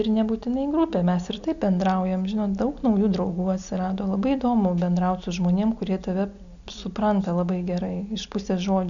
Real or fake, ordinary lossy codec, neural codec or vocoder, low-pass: real; MP3, 96 kbps; none; 7.2 kHz